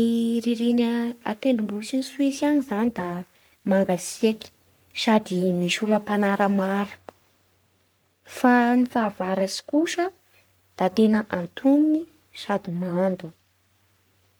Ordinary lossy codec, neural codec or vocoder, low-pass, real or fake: none; codec, 44.1 kHz, 3.4 kbps, Pupu-Codec; none; fake